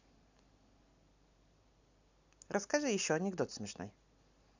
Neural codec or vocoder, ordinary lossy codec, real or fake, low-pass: none; none; real; 7.2 kHz